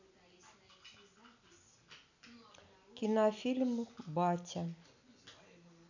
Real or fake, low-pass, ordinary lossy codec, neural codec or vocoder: real; 7.2 kHz; none; none